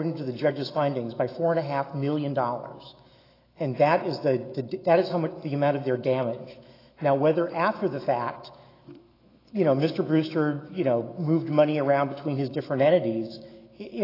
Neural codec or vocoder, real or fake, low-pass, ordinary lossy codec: autoencoder, 48 kHz, 128 numbers a frame, DAC-VAE, trained on Japanese speech; fake; 5.4 kHz; AAC, 24 kbps